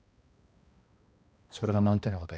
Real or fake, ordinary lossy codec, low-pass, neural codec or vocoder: fake; none; none; codec, 16 kHz, 1 kbps, X-Codec, HuBERT features, trained on balanced general audio